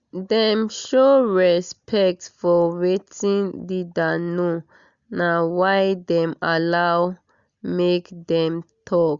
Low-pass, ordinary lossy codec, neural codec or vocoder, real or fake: 7.2 kHz; Opus, 64 kbps; none; real